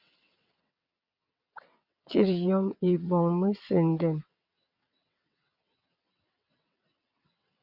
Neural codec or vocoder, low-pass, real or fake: vocoder, 22.05 kHz, 80 mel bands, WaveNeXt; 5.4 kHz; fake